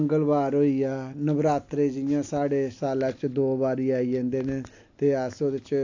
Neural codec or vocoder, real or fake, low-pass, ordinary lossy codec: none; real; 7.2 kHz; AAC, 48 kbps